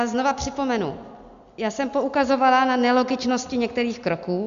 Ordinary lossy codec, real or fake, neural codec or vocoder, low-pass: MP3, 64 kbps; real; none; 7.2 kHz